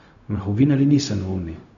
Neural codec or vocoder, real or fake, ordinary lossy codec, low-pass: codec, 16 kHz, 0.4 kbps, LongCat-Audio-Codec; fake; none; 7.2 kHz